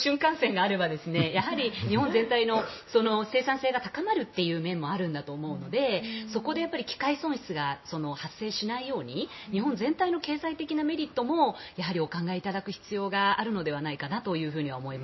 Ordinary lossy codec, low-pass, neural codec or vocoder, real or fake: MP3, 24 kbps; 7.2 kHz; none; real